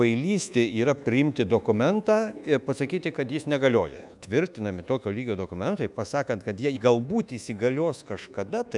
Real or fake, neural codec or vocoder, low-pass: fake; codec, 24 kHz, 1.2 kbps, DualCodec; 10.8 kHz